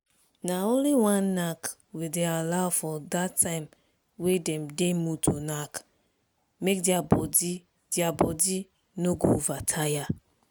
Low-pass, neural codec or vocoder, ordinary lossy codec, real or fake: none; none; none; real